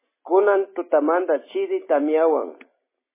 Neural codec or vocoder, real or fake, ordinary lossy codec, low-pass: none; real; MP3, 16 kbps; 3.6 kHz